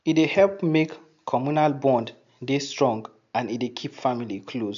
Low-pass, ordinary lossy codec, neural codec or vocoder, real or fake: 7.2 kHz; MP3, 64 kbps; none; real